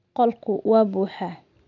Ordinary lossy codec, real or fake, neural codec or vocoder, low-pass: none; real; none; 7.2 kHz